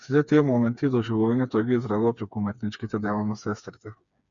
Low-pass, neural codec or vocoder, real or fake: 7.2 kHz; codec, 16 kHz, 4 kbps, FreqCodec, smaller model; fake